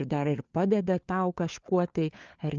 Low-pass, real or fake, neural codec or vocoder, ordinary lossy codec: 7.2 kHz; fake; codec, 16 kHz, 4 kbps, FunCodec, trained on LibriTTS, 50 frames a second; Opus, 24 kbps